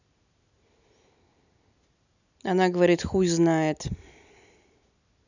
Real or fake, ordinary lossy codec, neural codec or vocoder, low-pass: real; none; none; 7.2 kHz